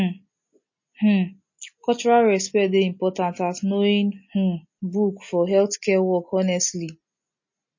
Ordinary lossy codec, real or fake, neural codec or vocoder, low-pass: MP3, 32 kbps; real; none; 7.2 kHz